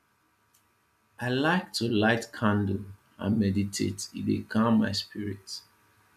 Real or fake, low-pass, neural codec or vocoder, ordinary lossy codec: real; 14.4 kHz; none; none